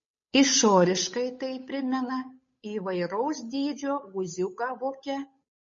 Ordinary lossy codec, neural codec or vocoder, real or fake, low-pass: MP3, 32 kbps; codec, 16 kHz, 8 kbps, FunCodec, trained on Chinese and English, 25 frames a second; fake; 7.2 kHz